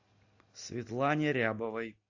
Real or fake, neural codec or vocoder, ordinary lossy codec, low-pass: real; none; AAC, 48 kbps; 7.2 kHz